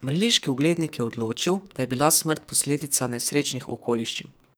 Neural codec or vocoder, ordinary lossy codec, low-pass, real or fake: codec, 44.1 kHz, 2.6 kbps, SNAC; none; none; fake